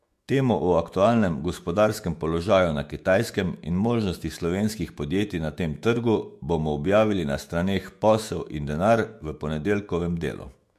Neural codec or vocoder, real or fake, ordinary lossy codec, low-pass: autoencoder, 48 kHz, 128 numbers a frame, DAC-VAE, trained on Japanese speech; fake; MP3, 64 kbps; 14.4 kHz